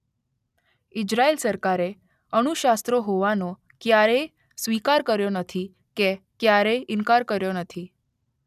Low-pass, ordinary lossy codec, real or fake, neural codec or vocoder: 14.4 kHz; none; real; none